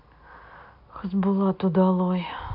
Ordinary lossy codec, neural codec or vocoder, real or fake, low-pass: AAC, 48 kbps; none; real; 5.4 kHz